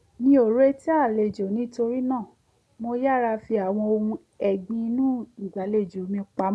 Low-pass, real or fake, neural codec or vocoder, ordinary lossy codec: none; real; none; none